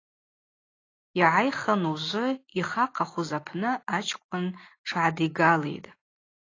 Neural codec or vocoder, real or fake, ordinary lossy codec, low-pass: none; real; AAC, 32 kbps; 7.2 kHz